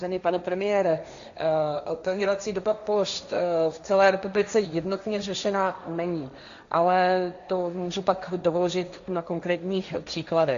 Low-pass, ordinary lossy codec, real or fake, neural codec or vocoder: 7.2 kHz; Opus, 64 kbps; fake; codec, 16 kHz, 1.1 kbps, Voila-Tokenizer